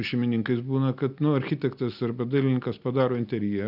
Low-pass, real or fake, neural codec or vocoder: 5.4 kHz; real; none